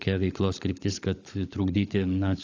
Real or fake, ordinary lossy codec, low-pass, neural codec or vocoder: fake; AAC, 48 kbps; 7.2 kHz; codec, 16 kHz, 16 kbps, FunCodec, trained on LibriTTS, 50 frames a second